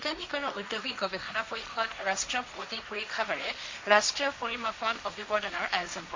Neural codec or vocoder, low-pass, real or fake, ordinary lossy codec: codec, 16 kHz, 1.1 kbps, Voila-Tokenizer; 7.2 kHz; fake; MP3, 48 kbps